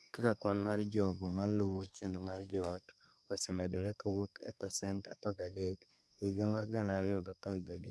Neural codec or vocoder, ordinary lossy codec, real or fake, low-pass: codec, 24 kHz, 1 kbps, SNAC; none; fake; none